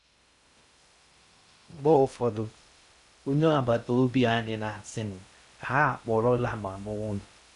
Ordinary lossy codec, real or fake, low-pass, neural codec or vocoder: none; fake; 10.8 kHz; codec, 16 kHz in and 24 kHz out, 0.6 kbps, FocalCodec, streaming, 2048 codes